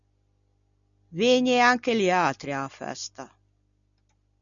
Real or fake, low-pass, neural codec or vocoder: real; 7.2 kHz; none